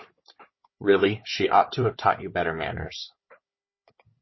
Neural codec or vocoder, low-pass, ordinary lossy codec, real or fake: codec, 16 kHz, 4 kbps, FunCodec, trained on Chinese and English, 50 frames a second; 7.2 kHz; MP3, 24 kbps; fake